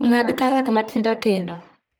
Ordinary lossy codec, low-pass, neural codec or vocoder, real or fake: none; none; codec, 44.1 kHz, 1.7 kbps, Pupu-Codec; fake